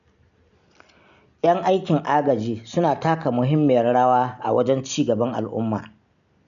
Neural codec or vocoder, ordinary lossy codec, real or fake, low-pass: none; none; real; 7.2 kHz